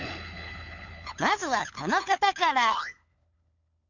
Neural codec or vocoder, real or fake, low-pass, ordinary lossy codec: codec, 16 kHz, 4 kbps, FunCodec, trained on LibriTTS, 50 frames a second; fake; 7.2 kHz; none